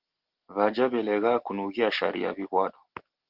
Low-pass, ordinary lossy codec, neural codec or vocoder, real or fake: 5.4 kHz; Opus, 16 kbps; none; real